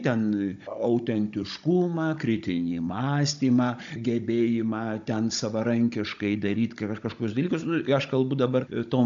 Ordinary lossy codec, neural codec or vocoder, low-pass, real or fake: AAC, 64 kbps; codec, 16 kHz, 16 kbps, FunCodec, trained on Chinese and English, 50 frames a second; 7.2 kHz; fake